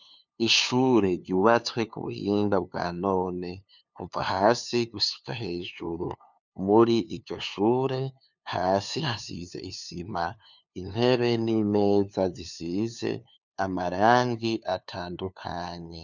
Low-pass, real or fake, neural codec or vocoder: 7.2 kHz; fake; codec, 16 kHz, 2 kbps, FunCodec, trained on LibriTTS, 25 frames a second